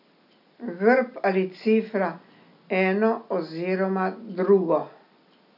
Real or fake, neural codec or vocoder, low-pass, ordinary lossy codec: real; none; 5.4 kHz; none